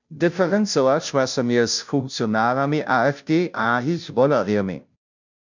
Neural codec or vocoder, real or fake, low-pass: codec, 16 kHz, 0.5 kbps, FunCodec, trained on Chinese and English, 25 frames a second; fake; 7.2 kHz